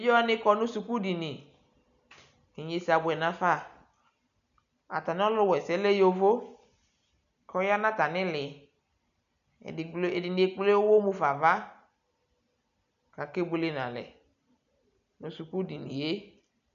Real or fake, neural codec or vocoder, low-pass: real; none; 7.2 kHz